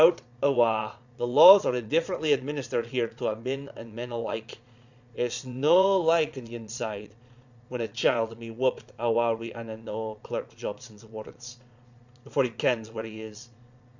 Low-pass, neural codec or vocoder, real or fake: 7.2 kHz; vocoder, 22.05 kHz, 80 mel bands, Vocos; fake